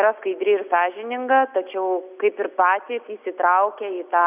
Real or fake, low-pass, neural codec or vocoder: real; 3.6 kHz; none